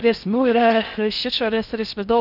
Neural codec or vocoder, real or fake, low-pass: codec, 16 kHz in and 24 kHz out, 0.6 kbps, FocalCodec, streaming, 4096 codes; fake; 5.4 kHz